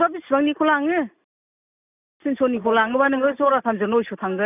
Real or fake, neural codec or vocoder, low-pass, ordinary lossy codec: real; none; 3.6 kHz; none